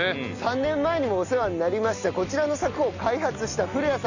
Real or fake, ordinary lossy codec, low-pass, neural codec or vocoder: real; none; 7.2 kHz; none